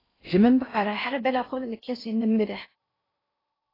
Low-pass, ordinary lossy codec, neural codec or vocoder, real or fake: 5.4 kHz; AAC, 24 kbps; codec, 16 kHz in and 24 kHz out, 0.6 kbps, FocalCodec, streaming, 4096 codes; fake